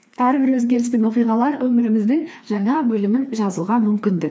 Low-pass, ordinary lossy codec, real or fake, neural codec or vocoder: none; none; fake; codec, 16 kHz, 2 kbps, FreqCodec, larger model